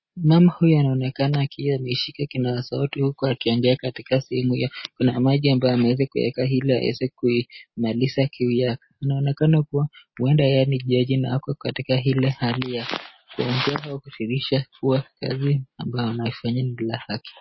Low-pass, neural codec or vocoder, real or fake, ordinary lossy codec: 7.2 kHz; none; real; MP3, 24 kbps